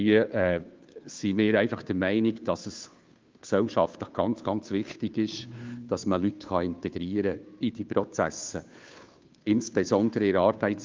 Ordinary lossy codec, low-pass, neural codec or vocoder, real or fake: Opus, 24 kbps; 7.2 kHz; codec, 16 kHz, 2 kbps, FunCodec, trained on Chinese and English, 25 frames a second; fake